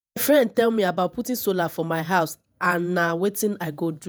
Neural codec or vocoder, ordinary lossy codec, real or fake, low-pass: vocoder, 48 kHz, 128 mel bands, Vocos; none; fake; none